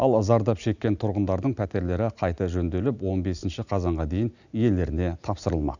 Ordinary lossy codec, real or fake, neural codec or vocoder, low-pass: none; real; none; 7.2 kHz